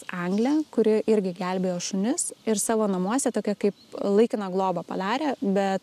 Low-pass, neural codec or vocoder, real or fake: 14.4 kHz; none; real